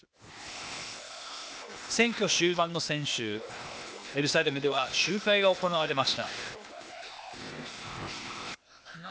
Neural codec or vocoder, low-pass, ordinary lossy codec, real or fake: codec, 16 kHz, 0.8 kbps, ZipCodec; none; none; fake